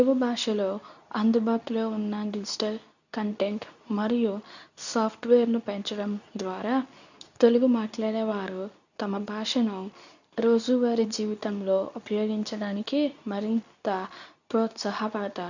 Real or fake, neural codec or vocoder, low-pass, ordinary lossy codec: fake; codec, 24 kHz, 0.9 kbps, WavTokenizer, medium speech release version 2; 7.2 kHz; none